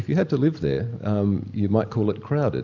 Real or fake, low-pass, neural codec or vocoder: real; 7.2 kHz; none